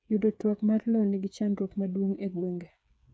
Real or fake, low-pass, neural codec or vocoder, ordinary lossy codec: fake; none; codec, 16 kHz, 8 kbps, FreqCodec, smaller model; none